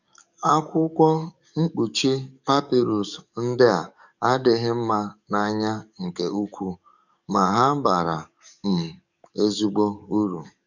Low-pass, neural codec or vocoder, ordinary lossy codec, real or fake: 7.2 kHz; codec, 44.1 kHz, 7.8 kbps, DAC; none; fake